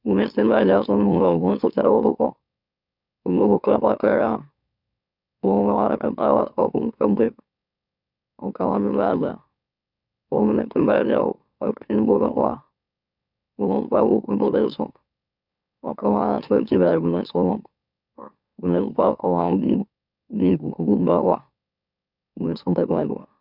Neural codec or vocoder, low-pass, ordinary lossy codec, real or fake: autoencoder, 44.1 kHz, a latent of 192 numbers a frame, MeloTTS; 5.4 kHz; none; fake